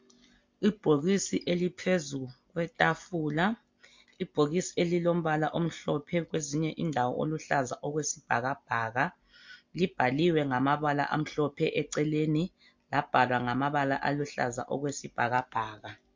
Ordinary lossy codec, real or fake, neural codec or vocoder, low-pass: MP3, 48 kbps; real; none; 7.2 kHz